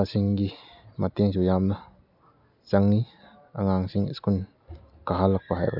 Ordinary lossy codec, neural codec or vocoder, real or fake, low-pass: none; none; real; 5.4 kHz